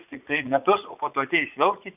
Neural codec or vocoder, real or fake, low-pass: vocoder, 44.1 kHz, 80 mel bands, Vocos; fake; 3.6 kHz